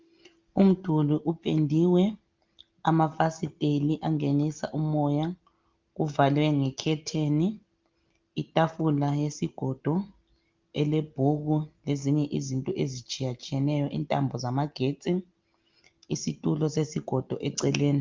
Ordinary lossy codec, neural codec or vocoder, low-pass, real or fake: Opus, 32 kbps; none; 7.2 kHz; real